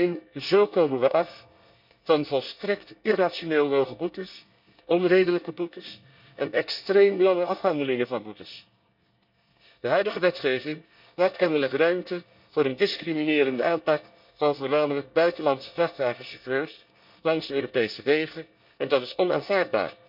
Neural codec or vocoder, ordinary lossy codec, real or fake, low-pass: codec, 24 kHz, 1 kbps, SNAC; none; fake; 5.4 kHz